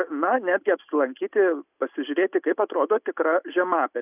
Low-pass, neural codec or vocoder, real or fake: 3.6 kHz; none; real